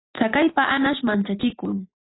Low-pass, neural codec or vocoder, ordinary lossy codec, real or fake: 7.2 kHz; none; AAC, 16 kbps; real